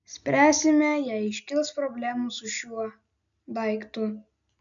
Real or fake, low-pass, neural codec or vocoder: real; 7.2 kHz; none